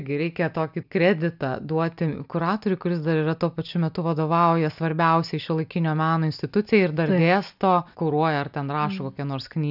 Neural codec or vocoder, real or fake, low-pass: none; real; 5.4 kHz